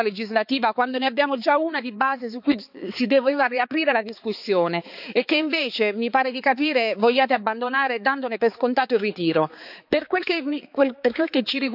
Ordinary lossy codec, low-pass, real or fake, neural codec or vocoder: none; 5.4 kHz; fake; codec, 16 kHz, 4 kbps, X-Codec, HuBERT features, trained on balanced general audio